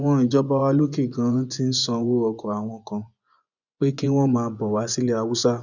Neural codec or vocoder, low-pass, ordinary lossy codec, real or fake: vocoder, 24 kHz, 100 mel bands, Vocos; 7.2 kHz; none; fake